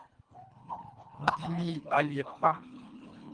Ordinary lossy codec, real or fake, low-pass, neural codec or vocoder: Opus, 32 kbps; fake; 9.9 kHz; codec, 24 kHz, 1.5 kbps, HILCodec